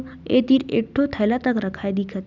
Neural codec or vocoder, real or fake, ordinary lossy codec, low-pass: none; real; none; 7.2 kHz